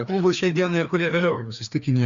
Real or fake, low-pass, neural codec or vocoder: fake; 7.2 kHz; codec, 16 kHz, 2 kbps, FreqCodec, larger model